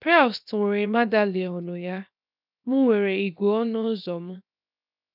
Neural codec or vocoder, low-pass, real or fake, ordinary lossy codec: codec, 16 kHz, 0.7 kbps, FocalCodec; 5.4 kHz; fake; none